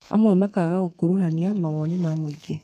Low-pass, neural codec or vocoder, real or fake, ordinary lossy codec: 14.4 kHz; codec, 32 kHz, 1.9 kbps, SNAC; fake; none